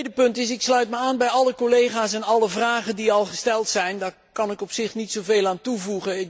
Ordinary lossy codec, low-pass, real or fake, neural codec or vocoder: none; none; real; none